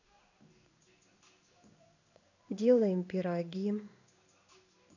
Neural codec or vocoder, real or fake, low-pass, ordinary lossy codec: codec, 16 kHz in and 24 kHz out, 1 kbps, XY-Tokenizer; fake; 7.2 kHz; none